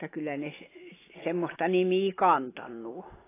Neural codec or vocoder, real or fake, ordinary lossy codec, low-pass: none; real; AAC, 16 kbps; 3.6 kHz